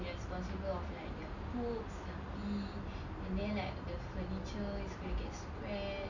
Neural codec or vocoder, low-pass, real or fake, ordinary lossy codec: none; 7.2 kHz; real; none